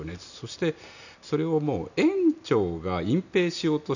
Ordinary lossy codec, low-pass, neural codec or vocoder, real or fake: none; 7.2 kHz; none; real